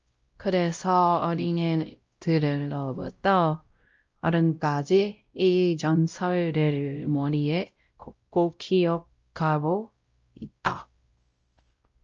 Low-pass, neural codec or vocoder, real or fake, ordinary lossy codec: 7.2 kHz; codec, 16 kHz, 0.5 kbps, X-Codec, WavLM features, trained on Multilingual LibriSpeech; fake; Opus, 24 kbps